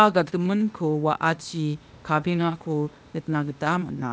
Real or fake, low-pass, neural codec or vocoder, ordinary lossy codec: fake; none; codec, 16 kHz, 0.8 kbps, ZipCodec; none